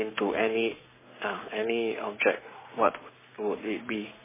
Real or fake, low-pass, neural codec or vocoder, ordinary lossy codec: fake; 3.6 kHz; codec, 44.1 kHz, 7.8 kbps, Pupu-Codec; MP3, 16 kbps